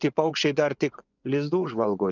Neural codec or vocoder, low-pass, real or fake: vocoder, 24 kHz, 100 mel bands, Vocos; 7.2 kHz; fake